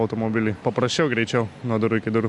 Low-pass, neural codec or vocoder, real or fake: 10.8 kHz; none; real